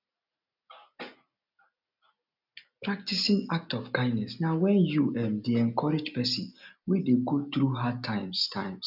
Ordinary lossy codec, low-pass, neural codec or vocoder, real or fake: none; 5.4 kHz; none; real